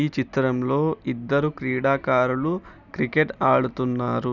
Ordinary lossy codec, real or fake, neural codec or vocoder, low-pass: none; real; none; 7.2 kHz